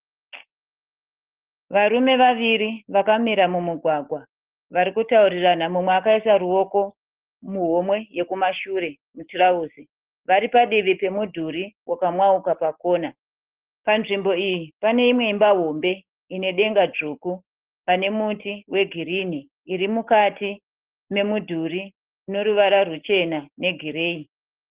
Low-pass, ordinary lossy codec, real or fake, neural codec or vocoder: 3.6 kHz; Opus, 16 kbps; real; none